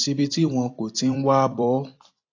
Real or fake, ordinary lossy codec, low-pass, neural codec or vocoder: fake; AAC, 32 kbps; 7.2 kHz; vocoder, 44.1 kHz, 128 mel bands every 256 samples, BigVGAN v2